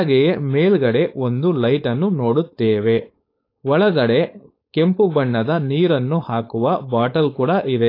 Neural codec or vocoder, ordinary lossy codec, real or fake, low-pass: codec, 16 kHz, 4.8 kbps, FACodec; AAC, 32 kbps; fake; 5.4 kHz